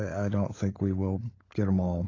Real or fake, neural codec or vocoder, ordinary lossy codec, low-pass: fake; codec, 16 kHz, 16 kbps, FunCodec, trained on LibriTTS, 50 frames a second; AAC, 32 kbps; 7.2 kHz